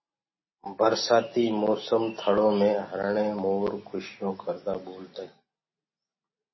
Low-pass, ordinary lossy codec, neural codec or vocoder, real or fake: 7.2 kHz; MP3, 24 kbps; none; real